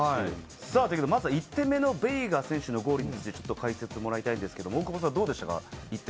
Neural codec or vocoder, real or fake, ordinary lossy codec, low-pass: none; real; none; none